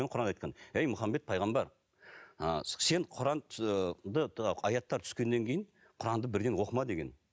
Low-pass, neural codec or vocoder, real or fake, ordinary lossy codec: none; none; real; none